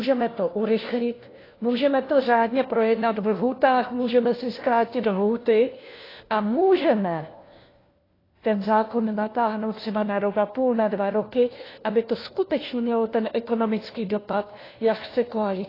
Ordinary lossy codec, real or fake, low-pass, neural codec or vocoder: AAC, 24 kbps; fake; 5.4 kHz; codec, 16 kHz, 1 kbps, FunCodec, trained on LibriTTS, 50 frames a second